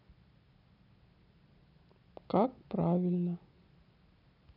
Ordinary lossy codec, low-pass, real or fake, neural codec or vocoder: none; 5.4 kHz; real; none